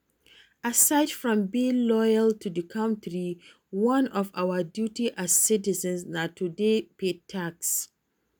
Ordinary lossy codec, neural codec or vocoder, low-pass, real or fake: none; none; none; real